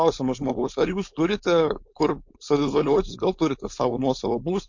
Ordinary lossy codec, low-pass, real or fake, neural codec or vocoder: MP3, 48 kbps; 7.2 kHz; fake; codec, 16 kHz, 4.8 kbps, FACodec